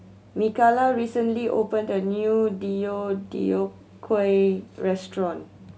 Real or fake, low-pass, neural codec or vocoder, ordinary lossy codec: real; none; none; none